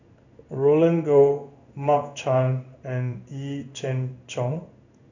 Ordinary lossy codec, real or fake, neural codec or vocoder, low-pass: none; fake; codec, 16 kHz in and 24 kHz out, 1 kbps, XY-Tokenizer; 7.2 kHz